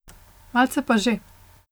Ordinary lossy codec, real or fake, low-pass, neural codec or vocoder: none; real; none; none